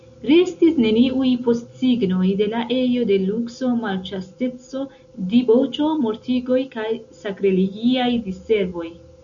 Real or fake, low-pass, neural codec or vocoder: real; 7.2 kHz; none